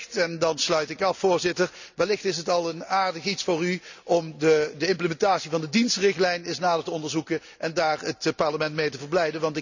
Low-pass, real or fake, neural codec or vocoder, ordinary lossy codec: 7.2 kHz; real; none; none